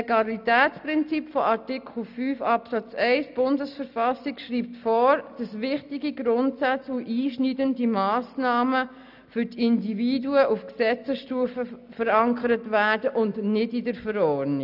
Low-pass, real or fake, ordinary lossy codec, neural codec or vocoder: 5.4 kHz; real; none; none